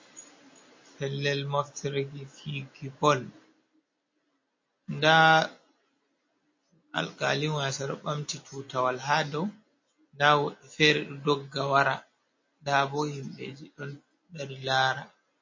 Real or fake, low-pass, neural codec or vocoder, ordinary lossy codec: real; 7.2 kHz; none; MP3, 32 kbps